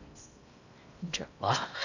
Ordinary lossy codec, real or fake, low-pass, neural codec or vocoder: none; fake; 7.2 kHz; codec, 16 kHz in and 24 kHz out, 0.8 kbps, FocalCodec, streaming, 65536 codes